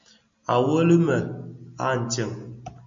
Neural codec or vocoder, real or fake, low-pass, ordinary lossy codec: none; real; 7.2 kHz; MP3, 64 kbps